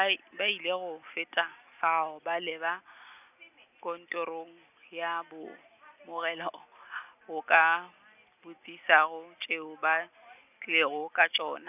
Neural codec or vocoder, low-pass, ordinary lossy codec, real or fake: none; 3.6 kHz; none; real